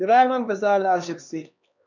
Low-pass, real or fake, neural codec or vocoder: 7.2 kHz; fake; codec, 16 kHz, 2 kbps, X-Codec, HuBERT features, trained on LibriSpeech